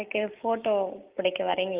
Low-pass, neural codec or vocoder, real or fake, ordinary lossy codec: 3.6 kHz; none; real; Opus, 24 kbps